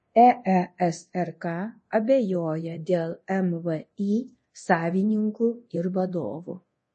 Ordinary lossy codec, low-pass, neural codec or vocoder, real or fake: MP3, 32 kbps; 10.8 kHz; codec, 24 kHz, 0.9 kbps, DualCodec; fake